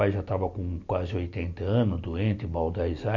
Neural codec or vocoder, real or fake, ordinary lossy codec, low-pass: none; real; none; 7.2 kHz